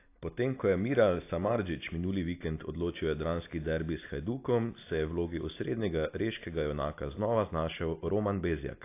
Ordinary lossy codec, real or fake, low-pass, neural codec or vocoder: AAC, 24 kbps; real; 3.6 kHz; none